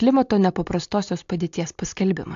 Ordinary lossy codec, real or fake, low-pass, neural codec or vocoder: AAC, 64 kbps; real; 7.2 kHz; none